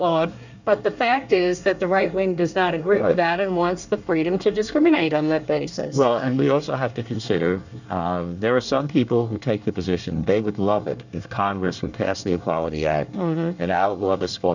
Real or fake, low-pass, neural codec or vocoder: fake; 7.2 kHz; codec, 24 kHz, 1 kbps, SNAC